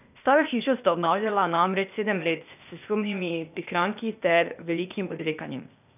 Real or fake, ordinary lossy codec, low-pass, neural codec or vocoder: fake; none; 3.6 kHz; codec, 16 kHz, 0.8 kbps, ZipCodec